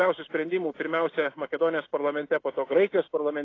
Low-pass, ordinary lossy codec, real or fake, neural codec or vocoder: 7.2 kHz; AAC, 32 kbps; real; none